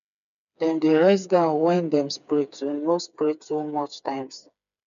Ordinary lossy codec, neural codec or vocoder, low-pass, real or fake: none; codec, 16 kHz, 4 kbps, FreqCodec, smaller model; 7.2 kHz; fake